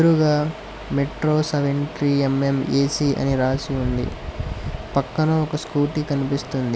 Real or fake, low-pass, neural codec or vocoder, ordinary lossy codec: real; none; none; none